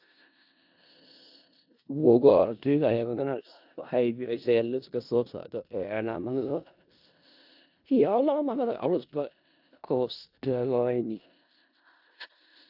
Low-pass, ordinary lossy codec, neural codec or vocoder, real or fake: 5.4 kHz; AAC, 48 kbps; codec, 16 kHz in and 24 kHz out, 0.4 kbps, LongCat-Audio-Codec, four codebook decoder; fake